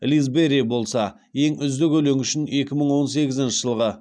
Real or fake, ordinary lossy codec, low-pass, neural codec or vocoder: real; none; 9.9 kHz; none